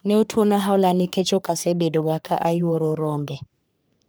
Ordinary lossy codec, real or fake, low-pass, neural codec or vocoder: none; fake; none; codec, 44.1 kHz, 3.4 kbps, Pupu-Codec